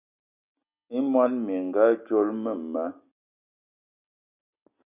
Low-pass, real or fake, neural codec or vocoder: 3.6 kHz; real; none